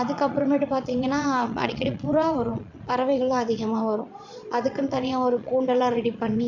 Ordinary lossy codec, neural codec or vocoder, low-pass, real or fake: none; vocoder, 22.05 kHz, 80 mel bands, Vocos; 7.2 kHz; fake